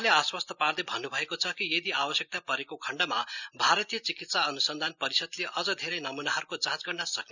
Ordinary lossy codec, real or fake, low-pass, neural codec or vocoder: none; real; 7.2 kHz; none